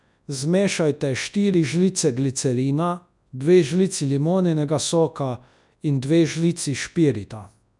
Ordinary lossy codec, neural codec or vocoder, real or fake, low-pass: none; codec, 24 kHz, 0.9 kbps, WavTokenizer, large speech release; fake; 10.8 kHz